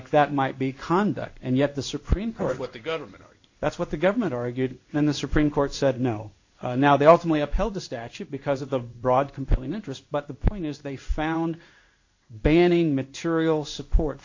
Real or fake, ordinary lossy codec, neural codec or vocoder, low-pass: fake; AAC, 48 kbps; codec, 16 kHz in and 24 kHz out, 1 kbps, XY-Tokenizer; 7.2 kHz